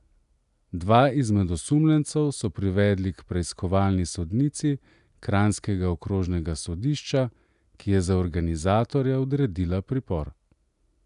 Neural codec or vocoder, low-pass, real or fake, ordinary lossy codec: none; 10.8 kHz; real; none